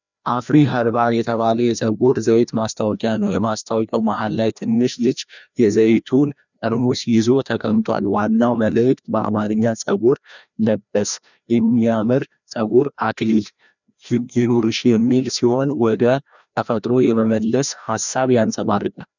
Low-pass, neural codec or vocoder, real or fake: 7.2 kHz; codec, 16 kHz, 1 kbps, FreqCodec, larger model; fake